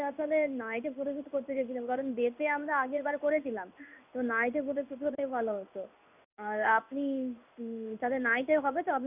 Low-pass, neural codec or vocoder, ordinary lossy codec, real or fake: 3.6 kHz; codec, 16 kHz in and 24 kHz out, 1 kbps, XY-Tokenizer; none; fake